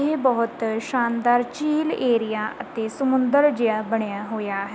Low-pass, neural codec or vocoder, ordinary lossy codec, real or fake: none; none; none; real